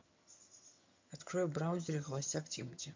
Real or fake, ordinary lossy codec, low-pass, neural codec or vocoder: fake; MP3, 48 kbps; 7.2 kHz; vocoder, 22.05 kHz, 80 mel bands, HiFi-GAN